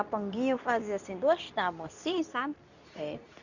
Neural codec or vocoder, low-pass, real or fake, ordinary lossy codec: codec, 24 kHz, 0.9 kbps, WavTokenizer, medium speech release version 2; 7.2 kHz; fake; none